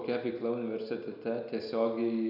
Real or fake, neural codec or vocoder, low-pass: real; none; 5.4 kHz